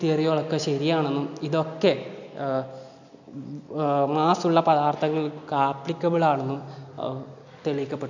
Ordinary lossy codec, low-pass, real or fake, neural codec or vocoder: none; 7.2 kHz; real; none